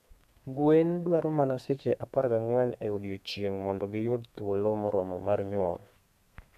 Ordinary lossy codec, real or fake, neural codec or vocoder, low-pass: none; fake; codec, 32 kHz, 1.9 kbps, SNAC; 14.4 kHz